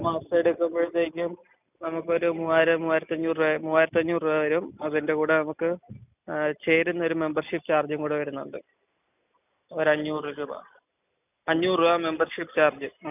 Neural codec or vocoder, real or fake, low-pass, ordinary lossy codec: none; real; 3.6 kHz; none